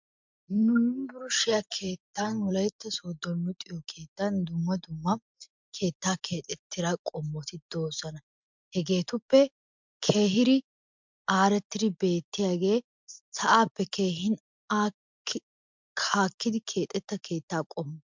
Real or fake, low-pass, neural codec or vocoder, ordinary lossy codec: real; 7.2 kHz; none; MP3, 64 kbps